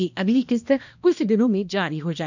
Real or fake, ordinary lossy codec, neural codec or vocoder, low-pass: fake; none; codec, 16 kHz, 1 kbps, X-Codec, HuBERT features, trained on balanced general audio; 7.2 kHz